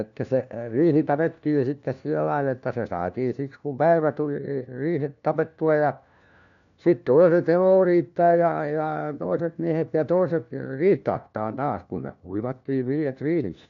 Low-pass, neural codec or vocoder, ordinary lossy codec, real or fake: 7.2 kHz; codec, 16 kHz, 1 kbps, FunCodec, trained on LibriTTS, 50 frames a second; none; fake